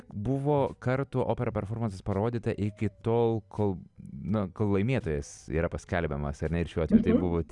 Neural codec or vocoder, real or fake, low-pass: none; real; 10.8 kHz